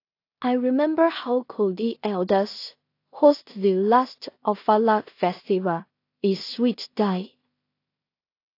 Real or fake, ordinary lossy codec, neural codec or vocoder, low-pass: fake; AAC, 32 kbps; codec, 16 kHz in and 24 kHz out, 0.4 kbps, LongCat-Audio-Codec, two codebook decoder; 5.4 kHz